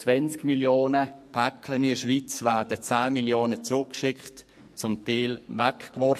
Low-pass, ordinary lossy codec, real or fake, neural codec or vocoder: 14.4 kHz; MP3, 64 kbps; fake; codec, 44.1 kHz, 2.6 kbps, SNAC